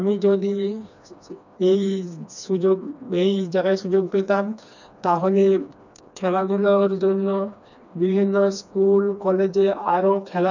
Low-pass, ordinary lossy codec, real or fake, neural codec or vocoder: 7.2 kHz; none; fake; codec, 16 kHz, 2 kbps, FreqCodec, smaller model